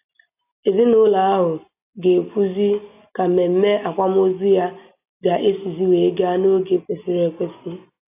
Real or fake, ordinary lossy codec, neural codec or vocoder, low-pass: real; AAC, 32 kbps; none; 3.6 kHz